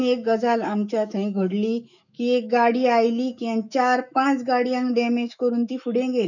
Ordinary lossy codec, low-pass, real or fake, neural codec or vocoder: none; 7.2 kHz; fake; autoencoder, 48 kHz, 128 numbers a frame, DAC-VAE, trained on Japanese speech